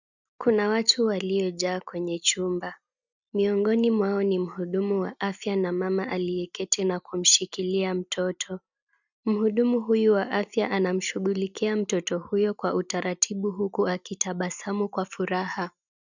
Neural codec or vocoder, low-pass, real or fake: none; 7.2 kHz; real